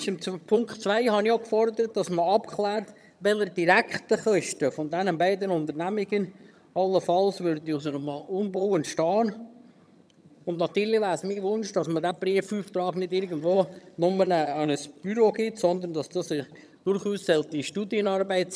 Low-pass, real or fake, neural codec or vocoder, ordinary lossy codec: none; fake; vocoder, 22.05 kHz, 80 mel bands, HiFi-GAN; none